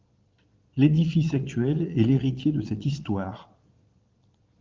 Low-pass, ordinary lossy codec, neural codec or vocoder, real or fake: 7.2 kHz; Opus, 32 kbps; vocoder, 22.05 kHz, 80 mel bands, Vocos; fake